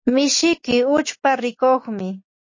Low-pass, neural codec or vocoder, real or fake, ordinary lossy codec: 7.2 kHz; none; real; MP3, 32 kbps